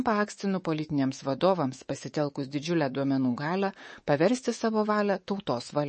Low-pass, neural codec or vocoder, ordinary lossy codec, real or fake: 10.8 kHz; codec, 24 kHz, 3.1 kbps, DualCodec; MP3, 32 kbps; fake